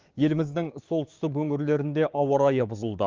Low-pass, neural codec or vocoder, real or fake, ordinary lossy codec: 7.2 kHz; codec, 16 kHz, 6 kbps, DAC; fake; Opus, 32 kbps